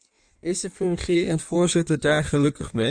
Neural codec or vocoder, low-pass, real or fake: codec, 16 kHz in and 24 kHz out, 1.1 kbps, FireRedTTS-2 codec; 9.9 kHz; fake